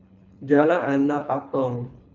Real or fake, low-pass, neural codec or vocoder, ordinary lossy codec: fake; 7.2 kHz; codec, 24 kHz, 3 kbps, HILCodec; none